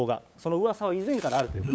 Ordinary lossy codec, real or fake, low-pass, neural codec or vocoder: none; fake; none; codec, 16 kHz, 8 kbps, FunCodec, trained on LibriTTS, 25 frames a second